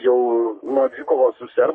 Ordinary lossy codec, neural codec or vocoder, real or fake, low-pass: MP3, 32 kbps; codec, 44.1 kHz, 3.4 kbps, Pupu-Codec; fake; 9.9 kHz